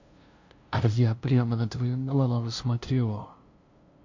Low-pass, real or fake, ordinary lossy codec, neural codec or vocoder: 7.2 kHz; fake; MP3, 48 kbps; codec, 16 kHz, 0.5 kbps, FunCodec, trained on LibriTTS, 25 frames a second